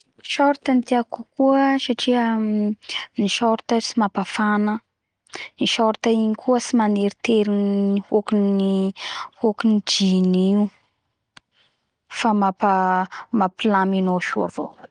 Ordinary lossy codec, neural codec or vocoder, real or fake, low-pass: Opus, 24 kbps; none; real; 9.9 kHz